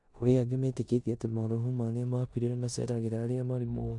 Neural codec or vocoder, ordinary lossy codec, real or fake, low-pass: codec, 16 kHz in and 24 kHz out, 0.9 kbps, LongCat-Audio-Codec, four codebook decoder; none; fake; 10.8 kHz